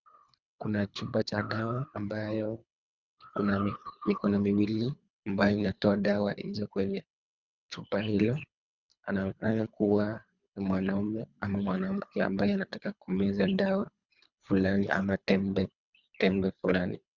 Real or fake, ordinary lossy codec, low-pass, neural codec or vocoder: fake; Opus, 64 kbps; 7.2 kHz; codec, 24 kHz, 3 kbps, HILCodec